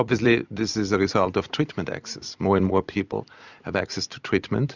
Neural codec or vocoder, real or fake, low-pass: vocoder, 44.1 kHz, 128 mel bands every 256 samples, BigVGAN v2; fake; 7.2 kHz